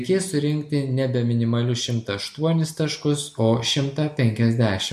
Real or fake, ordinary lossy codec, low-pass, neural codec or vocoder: real; MP3, 64 kbps; 14.4 kHz; none